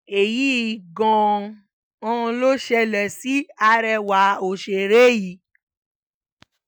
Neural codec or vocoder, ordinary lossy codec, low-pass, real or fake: none; none; none; real